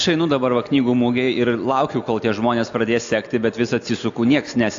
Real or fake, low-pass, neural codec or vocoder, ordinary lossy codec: real; 7.2 kHz; none; AAC, 64 kbps